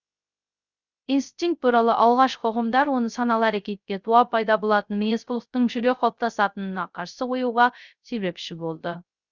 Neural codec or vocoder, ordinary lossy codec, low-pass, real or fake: codec, 16 kHz, 0.3 kbps, FocalCodec; Opus, 64 kbps; 7.2 kHz; fake